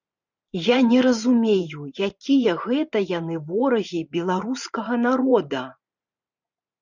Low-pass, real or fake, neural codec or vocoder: 7.2 kHz; fake; vocoder, 44.1 kHz, 128 mel bands every 512 samples, BigVGAN v2